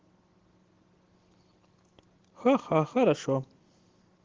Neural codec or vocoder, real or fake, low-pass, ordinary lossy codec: none; real; 7.2 kHz; Opus, 16 kbps